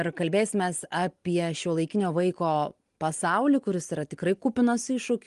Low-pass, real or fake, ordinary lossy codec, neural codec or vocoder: 10.8 kHz; real; Opus, 24 kbps; none